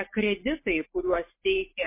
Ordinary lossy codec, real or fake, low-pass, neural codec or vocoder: MP3, 24 kbps; real; 3.6 kHz; none